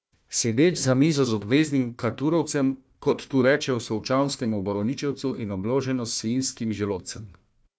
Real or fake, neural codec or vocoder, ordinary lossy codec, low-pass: fake; codec, 16 kHz, 1 kbps, FunCodec, trained on Chinese and English, 50 frames a second; none; none